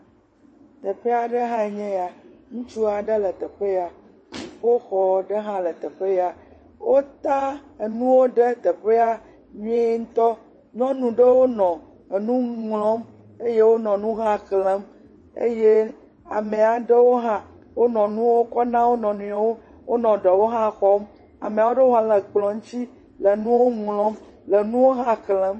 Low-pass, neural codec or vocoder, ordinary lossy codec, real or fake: 9.9 kHz; vocoder, 22.05 kHz, 80 mel bands, WaveNeXt; MP3, 32 kbps; fake